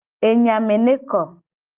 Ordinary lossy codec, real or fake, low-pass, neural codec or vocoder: Opus, 24 kbps; real; 3.6 kHz; none